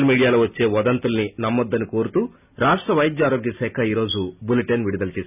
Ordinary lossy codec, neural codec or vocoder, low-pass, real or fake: none; none; 3.6 kHz; real